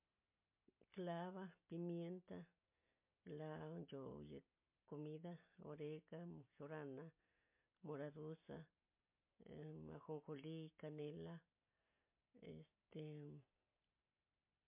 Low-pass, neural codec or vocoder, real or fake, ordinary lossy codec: 3.6 kHz; none; real; MP3, 32 kbps